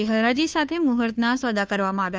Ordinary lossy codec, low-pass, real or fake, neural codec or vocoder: none; none; fake; codec, 16 kHz, 2 kbps, FunCodec, trained on Chinese and English, 25 frames a second